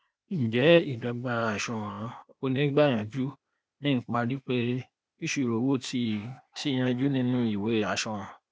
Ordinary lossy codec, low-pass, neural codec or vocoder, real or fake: none; none; codec, 16 kHz, 0.8 kbps, ZipCodec; fake